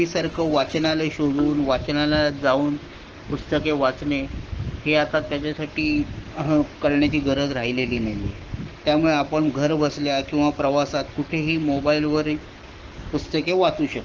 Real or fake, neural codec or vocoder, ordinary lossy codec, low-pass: fake; codec, 44.1 kHz, 7.8 kbps, Pupu-Codec; Opus, 16 kbps; 7.2 kHz